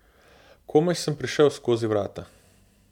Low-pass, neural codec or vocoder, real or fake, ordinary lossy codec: 19.8 kHz; none; real; MP3, 96 kbps